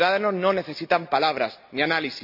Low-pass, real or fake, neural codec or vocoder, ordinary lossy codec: 5.4 kHz; real; none; none